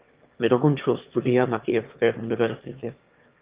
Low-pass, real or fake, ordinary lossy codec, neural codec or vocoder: 3.6 kHz; fake; Opus, 32 kbps; autoencoder, 22.05 kHz, a latent of 192 numbers a frame, VITS, trained on one speaker